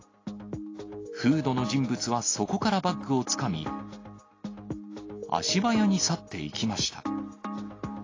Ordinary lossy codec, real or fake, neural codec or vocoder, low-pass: AAC, 32 kbps; real; none; 7.2 kHz